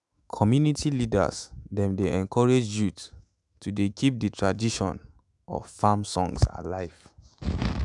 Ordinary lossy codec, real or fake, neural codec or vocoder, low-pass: none; fake; autoencoder, 48 kHz, 128 numbers a frame, DAC-VAE, trained on Japanese speech; 10.8 kHz